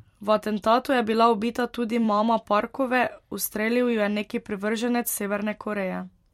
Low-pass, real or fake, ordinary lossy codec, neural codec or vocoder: 19.8 kHz; fake; MP3, 64 kbps; vocoder, 44.1 kHz, 128 mel bands every 256 samples, BigVGAN v2